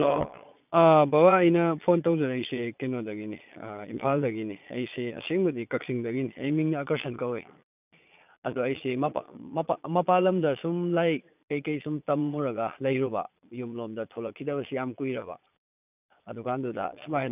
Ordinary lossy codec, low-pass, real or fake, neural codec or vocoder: none; 3.6 kHz; fake; vocoder, 22.05 kHz, 80 mel bands, Vocos